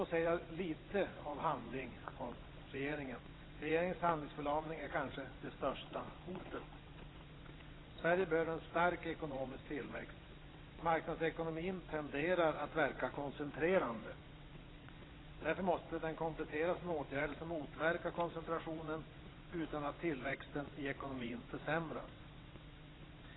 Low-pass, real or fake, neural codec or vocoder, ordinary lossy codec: 7.2 kHz; fake; vocoder, 22.05 kHz, 80 mel bands, WaveNeXt; AAC, 16 kbps